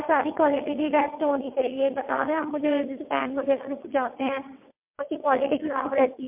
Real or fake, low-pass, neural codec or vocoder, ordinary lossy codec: fake; 3.6 kHz; vocoder, 22.05 kHz, 80 mel bands, WaveNeXt; MP3, 32 kbps